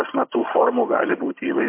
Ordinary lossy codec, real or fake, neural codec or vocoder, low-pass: MP3, 24 kbps; fake; vocoder, 22.05 kHz, 80 mel bands, HiFi-GAN; 3.6 kHz